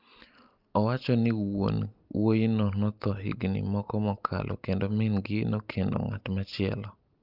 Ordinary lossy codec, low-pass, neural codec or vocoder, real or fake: Opus, 32 kbps; 5.4 kHz; none; real